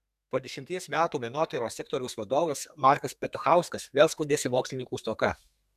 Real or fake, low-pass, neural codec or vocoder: fake; 14.4 kHz; codec, 32 kHz, 1.9 kbps, SNAC